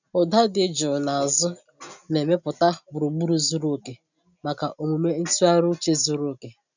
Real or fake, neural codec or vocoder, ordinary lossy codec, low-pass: real; none; none; 7.2 kHz